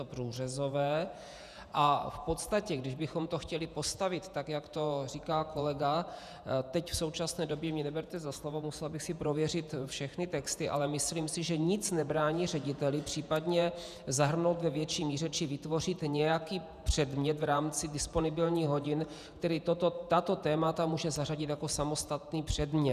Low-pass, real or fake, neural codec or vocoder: 14.4 kHz; fake; vocoder, 48 kHz, 128 mel bands, Vocos